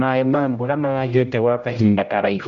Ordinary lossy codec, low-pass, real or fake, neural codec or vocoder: none; 7.2 kHz; fake; codec, 16 kHz, 0.5 kbps, X-Codec, HuBERT features, trained on general audio